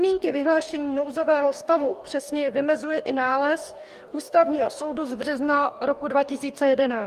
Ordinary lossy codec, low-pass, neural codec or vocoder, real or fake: Opus, 32 kbps; 14.4 kHz; codec, 44.1 kHz, 2.6 kbps, DAC; fake